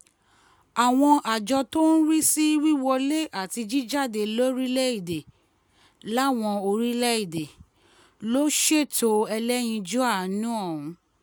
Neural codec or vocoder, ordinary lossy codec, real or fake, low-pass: none; none; real; none